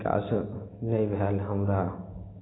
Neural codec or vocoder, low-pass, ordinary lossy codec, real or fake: none; 7.2 kHz; AAC, 16 kbps; real